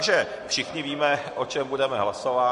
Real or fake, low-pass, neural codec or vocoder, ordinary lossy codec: real; 14.4 kHz; none; MP3, 48 kbps